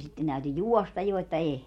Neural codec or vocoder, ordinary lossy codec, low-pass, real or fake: none; MP3, 64 kbps; 19.8 kHz; real